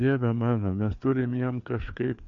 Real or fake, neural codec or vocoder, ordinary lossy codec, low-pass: fake; codec, 16 kHz, 4 kbps, FreqCodec, larger model; AAC, 64 kbps; 7.2 kHz